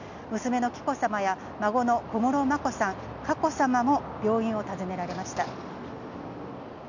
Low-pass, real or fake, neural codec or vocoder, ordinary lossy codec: 7.2 kHz; real; none; none